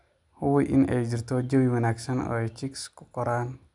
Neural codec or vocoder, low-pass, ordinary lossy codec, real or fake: none; 10.8 kHz; none; real